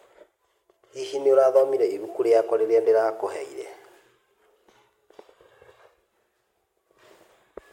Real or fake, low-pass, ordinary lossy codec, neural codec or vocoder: real; 19.8 kHz; MP3, 64 kbps; none